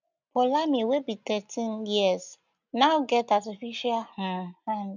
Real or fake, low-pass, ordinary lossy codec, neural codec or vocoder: real; 7.2 kHz; none; none